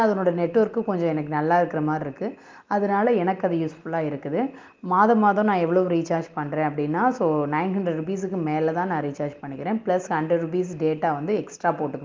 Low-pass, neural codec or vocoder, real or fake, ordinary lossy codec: 7.2 kHz; none; real; Opus, 32 kbps